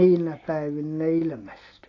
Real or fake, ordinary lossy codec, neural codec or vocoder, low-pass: real; AAC, 32 kbps; none; 7.2 kHz